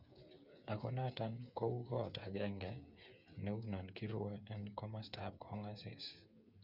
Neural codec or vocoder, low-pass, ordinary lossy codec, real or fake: codec, 16 kHz, 8 kbps, FreqCodec, smaller model; 5.4 kHz; none; fake